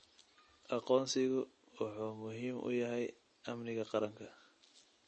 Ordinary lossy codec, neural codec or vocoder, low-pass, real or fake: MP3, 32 kbps; none; 10.8 kHz; real